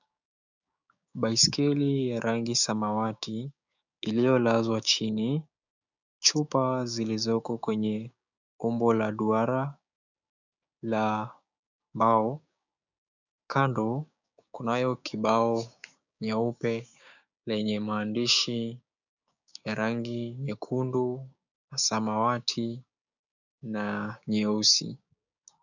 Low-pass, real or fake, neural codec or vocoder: 7.2 kHz; fake; codec, 16 kHz, 6 kbps, DAC